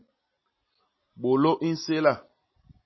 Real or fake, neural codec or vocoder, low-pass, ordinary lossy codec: real; none; 7.2 kHz; MP3, 24 kbps